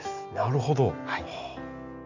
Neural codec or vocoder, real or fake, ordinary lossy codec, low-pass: none; real; none; 7.2 kHz